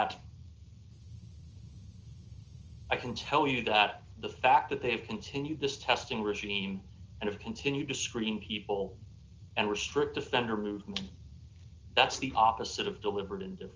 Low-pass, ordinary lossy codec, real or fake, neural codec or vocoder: 7.2 kHz; Opus, 24 kbps; real; none